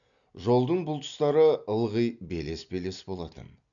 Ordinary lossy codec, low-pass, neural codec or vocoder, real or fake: none; 7.2 kHz; none; real